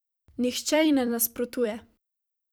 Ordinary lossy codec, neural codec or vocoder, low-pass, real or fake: none; vocoder, 44.1 kHz, 128 mel bands every 256 samples, BigVGAN v2; none; fake